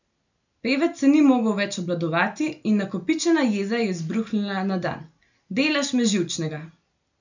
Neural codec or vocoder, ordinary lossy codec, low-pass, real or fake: none; none; 7.2 kHz; real